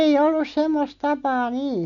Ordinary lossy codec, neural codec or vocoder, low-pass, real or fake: MP3, 96 kbps; none; 7.2 kHz; real